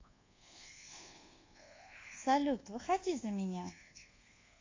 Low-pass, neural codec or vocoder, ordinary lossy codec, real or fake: 7.2 kHz; codec, 24 kHz, 1.2 kbps, DualCodec; AAC, 32 kbps; fake